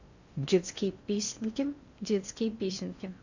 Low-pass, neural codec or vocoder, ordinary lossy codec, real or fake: 7.2 kHz; codec, 16 kHz in and 24 kHz out, 0.6 kbps, FocalCodec, streaming, 4096 codes; Opus, 64 kbps; fake